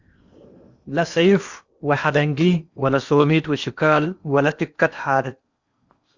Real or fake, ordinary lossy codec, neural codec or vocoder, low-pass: fake; Opus, 64 kbps; codec, 16 kHz in and 24 kHz out, 0.8 kbps, FocalCodec, streaming, 65536 codes; 7.2 kHz